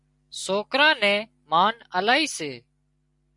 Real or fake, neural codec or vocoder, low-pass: real; none; 10.8 kHz